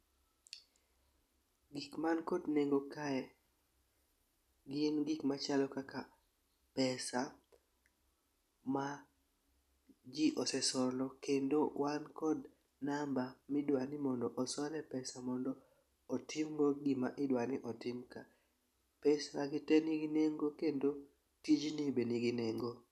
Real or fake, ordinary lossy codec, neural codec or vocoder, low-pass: real; none; none; 14.4 kHz